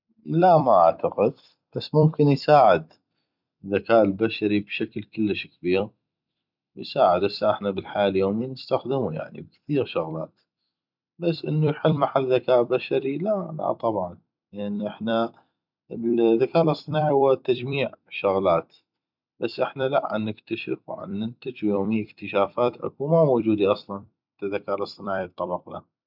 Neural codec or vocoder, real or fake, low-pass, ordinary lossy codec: vocoder, 44.1 kHz, 128 mel bands every 256 samples, BigVGAN v2; fake; 5.4 kHz; none